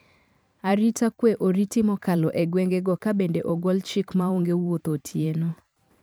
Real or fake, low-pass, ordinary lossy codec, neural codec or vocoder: fake; none; none; vocoder, 44.1 kHz, 128 mel bands every 512 samples, BigVGAN v2